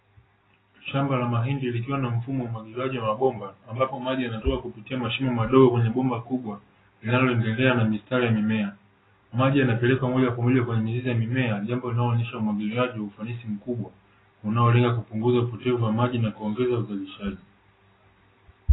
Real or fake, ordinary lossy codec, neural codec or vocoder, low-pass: real; AAC, 16 kbps; none; 7.2 kHz